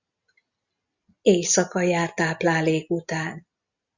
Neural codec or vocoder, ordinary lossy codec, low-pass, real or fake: none; Opus, 64 kbps; 7.2 kHz; real